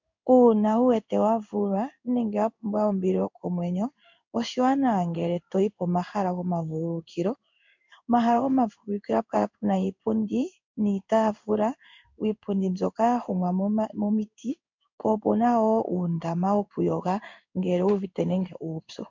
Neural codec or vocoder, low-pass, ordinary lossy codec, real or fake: codec, 16 kHz in and 24 kHz out, 1 kbps, XY-Tokenizer; 7.2 kHz; MP3, 64 kbps; fake